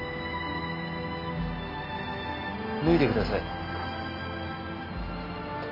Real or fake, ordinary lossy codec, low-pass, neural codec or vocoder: real; MP3, 32 kbps; 5.4 kHz; none